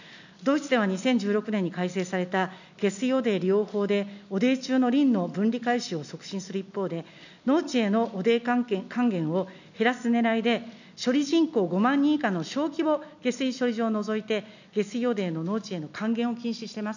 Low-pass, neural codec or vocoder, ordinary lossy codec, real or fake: 7.2 kHz; none; none; real